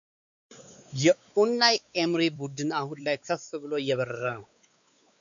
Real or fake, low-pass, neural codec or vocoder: fake; 7.2 kHz; codec, 16 kHz, 4 kbps, X-Codec, WavLM features, trained on Multilingual LibriSpeech